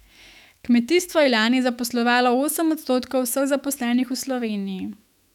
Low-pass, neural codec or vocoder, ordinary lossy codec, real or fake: 19.8 kHz; autoencoder, 48 kHz, 128 numbers a frame, DAC-VAE, trained on Japanese speech; none; fake